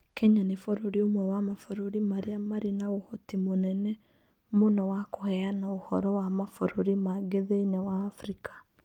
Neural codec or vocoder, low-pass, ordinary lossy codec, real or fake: none; 19.8 kHz; Opus, 32 kbps; real